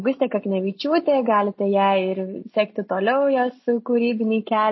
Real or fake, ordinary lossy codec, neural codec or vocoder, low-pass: real; MP3, 24 kbps; none; 7.2 kHz